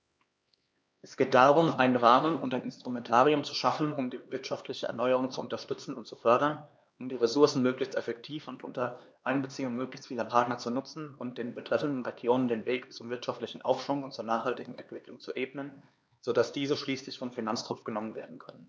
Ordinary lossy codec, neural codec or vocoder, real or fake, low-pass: none; codec, 16 kHz, 2 kbps, X-Codec, HuBERT features, trained on LibriSpeech; fake; none